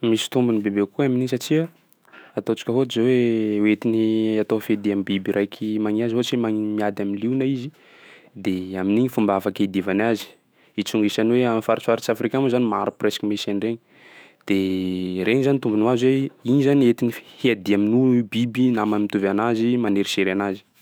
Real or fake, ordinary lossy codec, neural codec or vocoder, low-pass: fake; none; autoencoder, 48 kHz, 128 numbers a frame, DAC-VAE, trained on Japanese speech; none